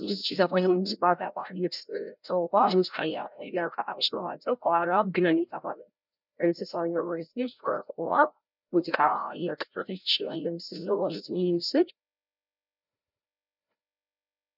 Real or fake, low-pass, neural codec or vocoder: fake; 5.4 kHz; codec, 16 kHz, 0.5 kbps, FreqCodec, larger model